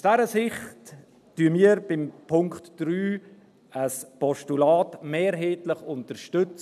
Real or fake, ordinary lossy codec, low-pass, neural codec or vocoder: real; none; 14.4 kHz; none